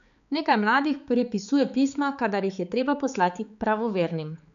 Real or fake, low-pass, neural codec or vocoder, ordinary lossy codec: fake; 7.2 kHz; codec, 16 kHz, 4 kbps, X-Codec, HuBERT features, trained on balanced general audio; none